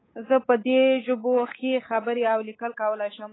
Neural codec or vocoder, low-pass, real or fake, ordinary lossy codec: codec, 24 kHz, 3.1 kbps, DualCodec; 7.2 kHz; fake; AAC, 16 kbps